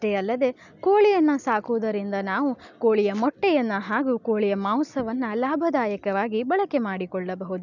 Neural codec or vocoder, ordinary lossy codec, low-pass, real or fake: none; none; 7.2 kHz; real